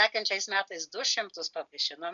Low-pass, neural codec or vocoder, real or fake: 7.2 kHz; none; real